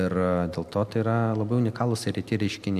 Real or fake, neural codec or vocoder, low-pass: real; none; 14.4 kHz